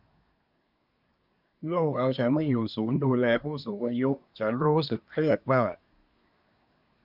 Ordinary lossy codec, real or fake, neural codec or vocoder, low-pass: none; fake; codec, 24 kHz, 1 kbps, SNAC; 5.4 kHz